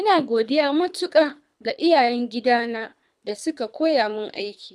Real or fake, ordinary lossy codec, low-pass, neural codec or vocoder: fake; none; none; codec, 24 kHz, 3 kbps, HILCodec